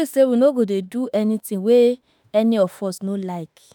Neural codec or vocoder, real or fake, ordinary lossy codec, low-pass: autoencoder, 48 kHz, 32 numbers a frame, DAC-VAE, trained on Japanese speech; fake; none; none